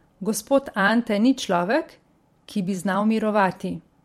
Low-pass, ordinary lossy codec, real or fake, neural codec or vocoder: 19.8 kHz; MP3, 64 kbps; fake; vocoder, 44.1 kHz, 128 mel bands every 512 samples, BigVGAN v2